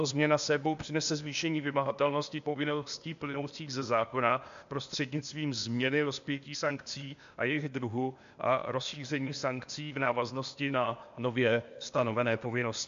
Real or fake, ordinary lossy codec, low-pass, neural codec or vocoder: fake; MP3, 64 kbps; 7.2 kHz; codec, 16 kHz, 0.8 kbps, ZipCodec